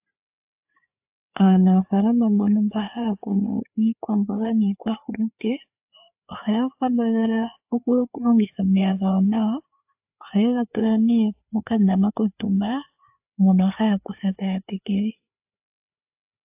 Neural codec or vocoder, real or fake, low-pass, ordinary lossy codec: codec, 16 kHz, 4 kbps, FreqCodec, larger model; fake; 3.6 kHz; AAC, 32 kbps